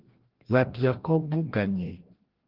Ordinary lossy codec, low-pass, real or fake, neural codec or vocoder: Opus, 16 kbps; 5.4 kHz; fake; codec, 16 kHz, 1 kbps, FreqCodec, larger model